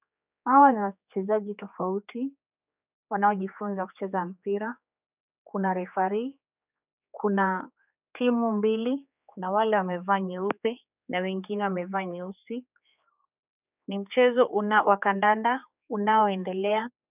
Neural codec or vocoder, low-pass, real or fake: codec, 16 kHz, 4 kbps, X-Codec, HuBERT features, trained on general audio; 3.6 kHz; fake